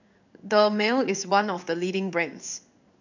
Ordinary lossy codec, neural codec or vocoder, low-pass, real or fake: none; codec, 16 kHz in and 24 kHz out, 1 kbps, XY-Tokenizer; 7.2 kHz; fake